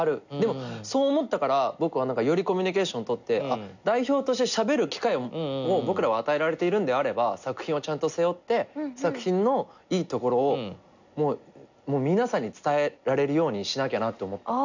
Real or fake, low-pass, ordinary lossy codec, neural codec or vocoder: real; 7.2 kHz; none; none